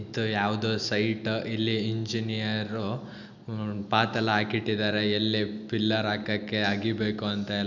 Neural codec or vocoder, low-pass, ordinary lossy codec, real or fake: none; 7.2 kHz; none; real